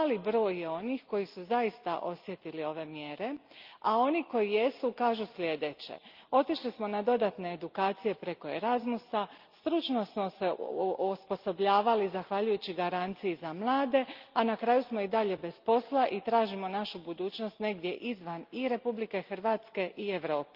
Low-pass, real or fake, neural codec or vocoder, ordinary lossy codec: 5.4 kHz; real; none; Opus, 32 kbps